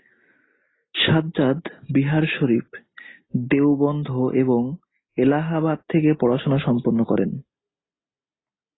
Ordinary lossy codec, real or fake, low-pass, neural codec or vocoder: AAC, 16 kbps; real; 7.2 kHz; none